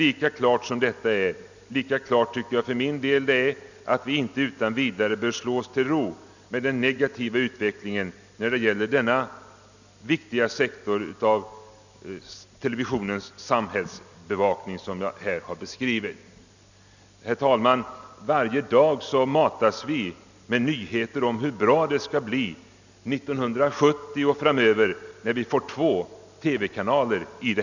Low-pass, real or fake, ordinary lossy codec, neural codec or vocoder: 7.2 kHz; real; none; none